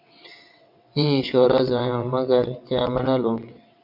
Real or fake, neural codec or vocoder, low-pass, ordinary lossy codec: fake; vocoder, 22.05 kHz, 80 mel bands, WaveNeXt; 5.4 kHz; MP3, 48 kbps